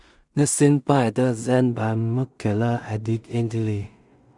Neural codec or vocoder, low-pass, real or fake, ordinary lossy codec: codec, 16 kHz in and 24 kHz out, 0.4 kbps, LongCat-Audio-Codec, two codebook decoder; 10.8 kHz; fake; Opus, 64 kbps